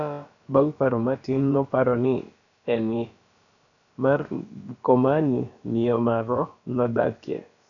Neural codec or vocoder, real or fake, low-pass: codec, 16 kHz, about 1 kbps, DyCAST, with the encoder's durations; fake; 7.2 kHz